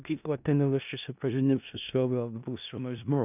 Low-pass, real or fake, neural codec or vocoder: 3.6 kHz; fake; codec, 16 kHz in and 24 kHz out, 0.4 kbps, LongCat-Audio-Codec, four codebook decoder